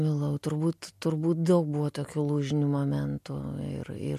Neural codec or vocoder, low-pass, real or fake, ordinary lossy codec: none; 14.4 kHz; real; MP3, 64 kbps